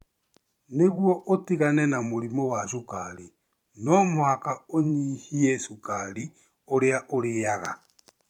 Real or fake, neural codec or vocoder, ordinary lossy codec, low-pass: fake; vocoder, 44.1 kHz, 128 mel bands every 512 samples, BigVGAN v2; MP3, 96 kbps; 19.8 kHz